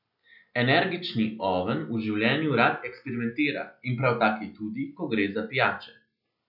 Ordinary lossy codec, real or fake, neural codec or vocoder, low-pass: none; real; none; 5.4 kHz